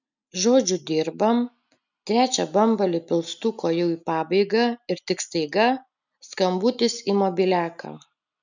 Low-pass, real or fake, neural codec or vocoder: 7.2 kHz; real; none